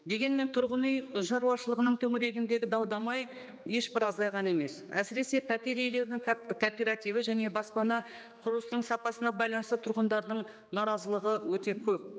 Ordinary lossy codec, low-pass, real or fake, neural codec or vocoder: none; none; fake; codec, 16 kHz, 2 kbps, X-Codec, HuBERT features, trained on general audio